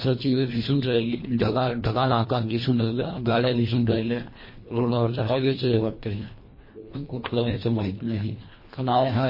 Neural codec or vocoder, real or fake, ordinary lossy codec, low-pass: codec, 24 kHz, 1.5 kbps, HILCodec; fake; MP3, 24 kbps; 5.4 kHz